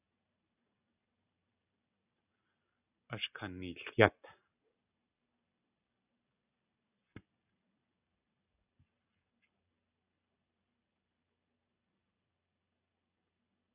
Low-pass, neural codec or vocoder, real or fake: 3.6 kHz; none; real